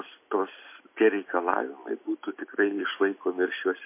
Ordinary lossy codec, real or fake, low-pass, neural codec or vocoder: MP3, 24 kbps; real; 3.6 kHz; none